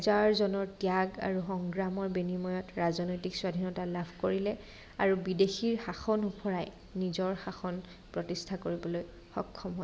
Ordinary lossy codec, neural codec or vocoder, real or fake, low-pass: none; none; real; none